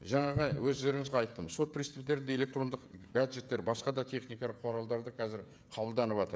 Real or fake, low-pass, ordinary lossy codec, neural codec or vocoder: fake; none; none; codec, 16 kHz, 16 kbps, FreqCodec, smaller model